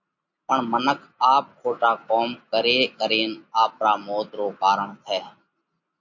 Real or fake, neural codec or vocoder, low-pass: real; none; 7.2 kHz